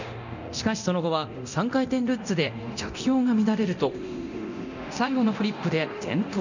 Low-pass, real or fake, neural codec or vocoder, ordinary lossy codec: 7.2 kHz; fake; codec, 24 kHz, 0.9 kbps, DualCodec; none